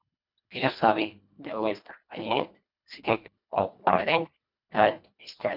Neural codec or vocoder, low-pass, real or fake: codec, 24 kHz, 1.5 kbps, HILCodec; 5.4 kHz; fake